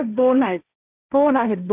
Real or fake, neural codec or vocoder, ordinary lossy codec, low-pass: fake; codec, 16 kHz, 1.1 kbps, Voila-Tokenizer; none; 3.6 kHz